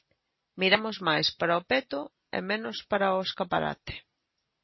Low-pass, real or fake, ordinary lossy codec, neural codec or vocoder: 7.2 kHz; real; MP3, 24 kbps; none